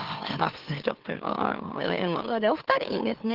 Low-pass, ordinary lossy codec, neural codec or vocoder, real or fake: 5.4 kHz; Opus, 16 kbps; autoencoder, 44.1 kHz, a latent of 192 numbers a frame, MeloTTS; fake